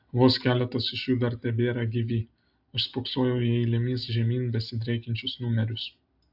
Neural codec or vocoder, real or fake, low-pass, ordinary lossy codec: none; real; 5.4 kHz; AAC, 48 kbps